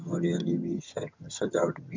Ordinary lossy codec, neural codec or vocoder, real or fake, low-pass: MP3, 64 kbps; vocoder, 22.05 kHz, 80 mel bands, HiFi-GAN; fake; 7.2 kHz